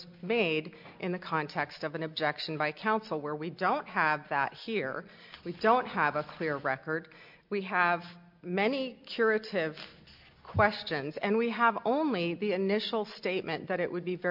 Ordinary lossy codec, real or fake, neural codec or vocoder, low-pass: MP3, 48 kbps; real; none; 5.4 kHz